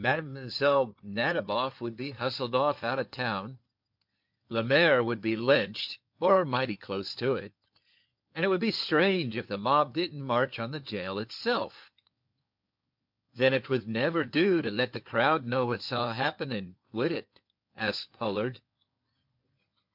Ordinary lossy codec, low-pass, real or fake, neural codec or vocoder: MP3, 48 kbps; 5.4 kHz; fake; codec, 16 kHz in and 24 kHz out, 2.2 kbps, FireRedTTS-2 codec